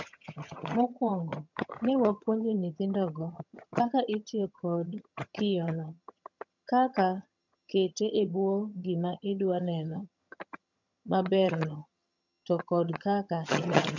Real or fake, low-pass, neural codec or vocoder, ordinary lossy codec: fake; 7.2 kHz; vocoder, 22.05 kHz, 80 mel bands, HiFi-GAN; none